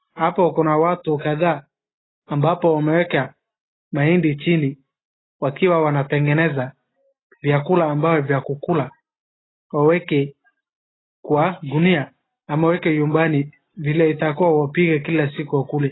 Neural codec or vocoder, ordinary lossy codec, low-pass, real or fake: none; AAC, 16 kbps; 7.2 kHz; real